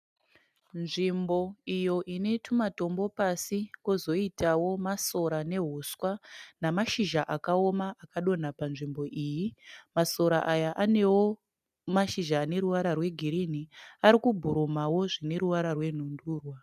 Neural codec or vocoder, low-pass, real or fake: none; 14.4 kHz; real